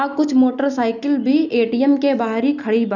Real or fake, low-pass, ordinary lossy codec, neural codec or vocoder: real; 7.2 kHz; none; none